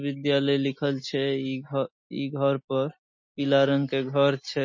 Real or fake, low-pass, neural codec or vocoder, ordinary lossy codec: real; 7.2 kHz; none; MP3, 32 kbps